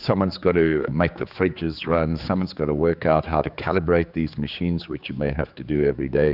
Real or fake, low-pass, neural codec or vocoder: fake; 5.4 kHz; codec, 16 kHz, 4 kbps, X-Codec, HuBERT features, trained on general audio